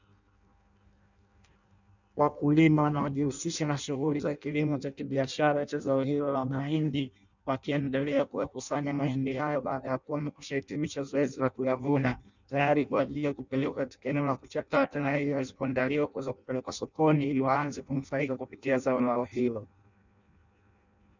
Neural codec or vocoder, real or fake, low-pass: codec, 16 kHz in and 24 kHz out, 0.6 kbps, FireRedTTS-2 codec; fake; 7.2 kHz